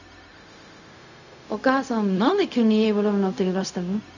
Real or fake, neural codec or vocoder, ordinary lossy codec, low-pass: fake; codec, 16 kHz, 0.4 kbps, LongCat-Audio-Codec; none; 7.2 kHz